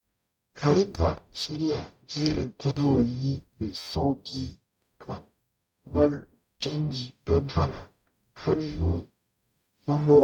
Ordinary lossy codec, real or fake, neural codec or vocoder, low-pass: none; fake; codec, 44.1 kHz, 0.9 kbps, DAC; 19.8 kHz